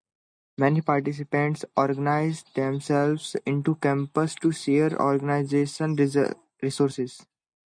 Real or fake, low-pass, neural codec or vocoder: real; 9.9 kHz; none